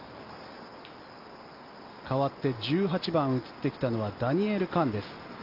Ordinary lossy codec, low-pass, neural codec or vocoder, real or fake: Opus, 32 kbps; 5.4 kHz; none; real